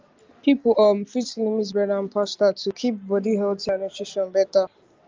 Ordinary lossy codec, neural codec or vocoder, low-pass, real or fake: Opus, 32 kbps; none; 7.2 kHz; real